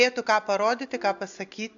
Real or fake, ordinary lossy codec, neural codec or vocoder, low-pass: real; AAC, 64 kbps; none; 7.2 kHz